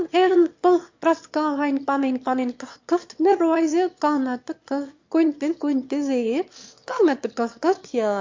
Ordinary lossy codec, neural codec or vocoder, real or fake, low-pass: MP3, 48 kbps; autoencoder, 22.05 kHz, a latent of 192 numbers a frame, VITS, trained on one speaker; fake; 7.2 kHz